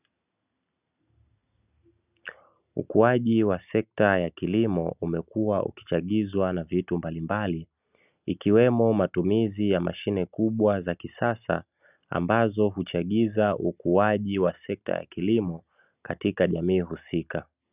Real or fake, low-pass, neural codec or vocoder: real; 3.6 kHz; none